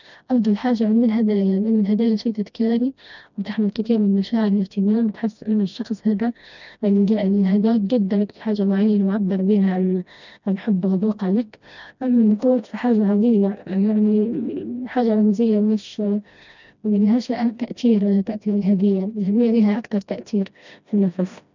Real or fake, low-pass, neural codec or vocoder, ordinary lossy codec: fake; 7.2 kHz; codec, 16 kHz, 1 kbps, FreqCodec, smaller model; none